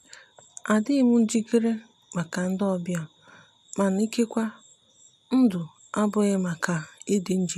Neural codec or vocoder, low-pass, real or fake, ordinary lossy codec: none; 14.4 kHz; real; MP3, 96 kbps